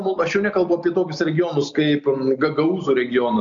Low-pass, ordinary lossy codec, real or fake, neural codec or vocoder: 7.2 kHz; MP3, 64 kbps; real; none